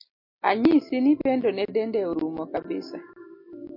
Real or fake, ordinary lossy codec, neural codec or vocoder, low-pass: real; MP3, 32 kbps; none; 5.4 kHz